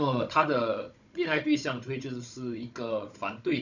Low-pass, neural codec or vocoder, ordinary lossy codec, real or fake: 7.2 kHz; codec, 16 kHz, 16 kbps, FunCodec, trained on Chinese and English, 50 frames a second; none; fake